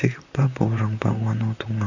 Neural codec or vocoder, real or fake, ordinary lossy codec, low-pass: vocoder, 44.1 kHz, 128 mel bands, Pupu-Vocoder; fake; none; 7.2 kHz